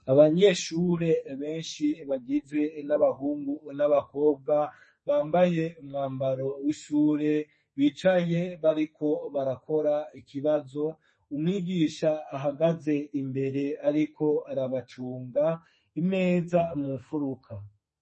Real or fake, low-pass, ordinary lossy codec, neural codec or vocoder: fake; 10.8 kHz; MP3, 32 kbps; codec, 32 kHz, 1.9 kbps, SNAC